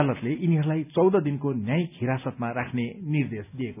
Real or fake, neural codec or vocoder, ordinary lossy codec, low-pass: real; none; none; 3.6 kHz